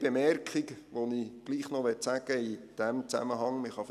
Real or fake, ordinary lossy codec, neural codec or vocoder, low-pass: real; none; none; 14.4 kHz